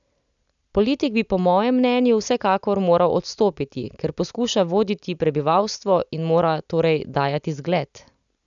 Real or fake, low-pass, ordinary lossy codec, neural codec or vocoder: real; 7.2 kHz; none; none